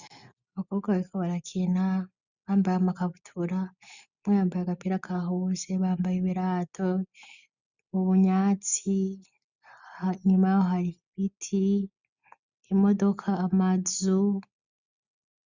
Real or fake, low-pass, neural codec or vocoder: real; 7.2 kHz; none